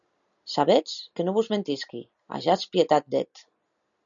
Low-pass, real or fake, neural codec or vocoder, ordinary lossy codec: 7.2 kHz; real; none; MP3, 96 kbps